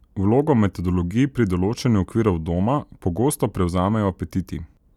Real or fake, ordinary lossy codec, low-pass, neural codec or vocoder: real; none; 19.8 kHz; none